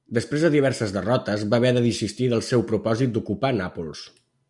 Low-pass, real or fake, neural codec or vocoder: 10.8 kHz; real; none